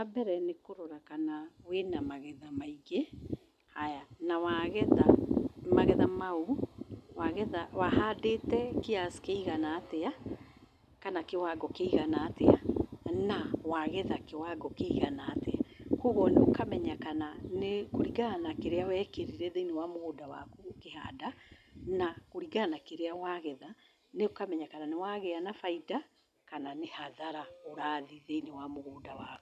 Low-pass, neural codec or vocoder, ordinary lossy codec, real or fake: 14.4 kHz; none; none; real